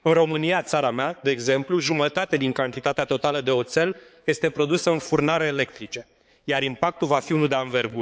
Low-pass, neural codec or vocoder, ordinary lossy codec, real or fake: none; codec, 16 kHz, 4 kbps, X-Codec, HuBERT features, trained on balanced general audio; none; fake